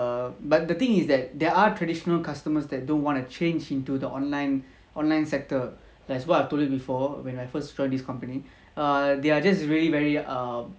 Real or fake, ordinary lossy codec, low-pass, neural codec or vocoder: real; none; none; none